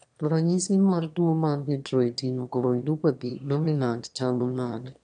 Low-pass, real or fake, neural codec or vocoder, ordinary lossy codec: 9.9 kHz; fake; autoencoder, 22.05 kHz, a latent of 192 numbers a frame, VITS, trained on one speaker; none